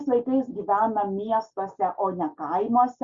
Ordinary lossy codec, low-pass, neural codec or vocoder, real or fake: Opus, 64 kbps; 7.2 kHz; none; real